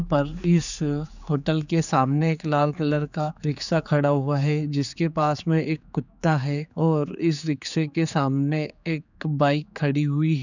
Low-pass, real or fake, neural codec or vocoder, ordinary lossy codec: 7.2 kHz; fake; codec, 16 kHz, 4 kbps, X-Codec, HuBERT features, trained on general audio; none